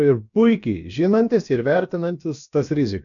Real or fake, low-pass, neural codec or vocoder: fake; 7.2 kHz; codec, 16 kHz, about 1 kbps, DyCAST, with the encoder's durations